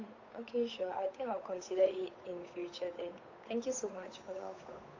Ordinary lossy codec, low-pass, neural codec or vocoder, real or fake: AAC, 48 kbps; 7.2 kHz; codec, 16 kHz, 8 kbps, FunCodec, trained on Chinese and English, 25 frames a second; fake